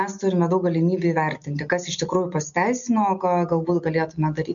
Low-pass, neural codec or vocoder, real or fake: 7.2 kHz; none; real